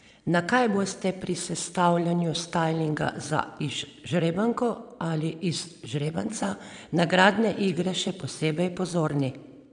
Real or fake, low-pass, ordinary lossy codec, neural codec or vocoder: fake; 9.9 kHz; none; vocoder, 22.05 kHz, 80 mel bands, Vocos